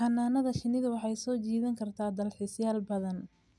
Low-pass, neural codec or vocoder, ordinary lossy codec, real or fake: none; none; none; real